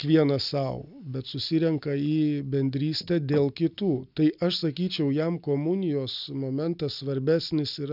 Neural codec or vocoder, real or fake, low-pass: none; real; 5.4 kHz